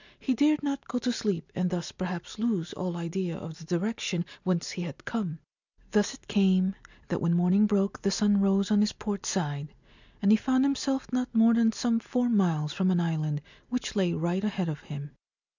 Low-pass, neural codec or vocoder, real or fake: 7.2 kHz; none; real